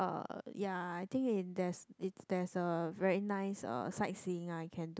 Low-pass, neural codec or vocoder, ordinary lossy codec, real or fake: none; none; none; real